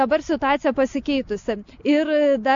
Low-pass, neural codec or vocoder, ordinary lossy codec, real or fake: 7.2 kHz; none; MP3, 48 kbps; real